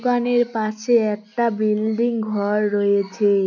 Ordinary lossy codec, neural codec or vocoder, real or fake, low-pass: none; none; real; 7.2 kHz